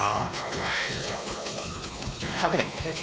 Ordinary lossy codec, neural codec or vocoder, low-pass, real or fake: none; codec, 16 kHz, 2 kbps, X-Codec, WavLM features, trained on Multilingual LibriSpeech; none; fake